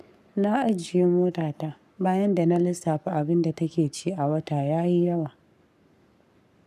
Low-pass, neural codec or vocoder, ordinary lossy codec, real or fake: 14.4 kHz; codec, 44.1 kHz, 7.8 kbps, Pupu-Codec; none; fake